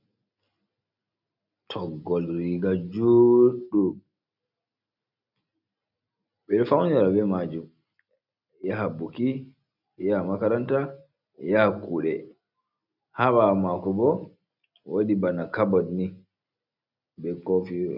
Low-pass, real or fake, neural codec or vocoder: 5.4 kHz; real; none